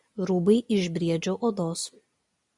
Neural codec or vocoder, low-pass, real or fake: none; 10.8 kHz; real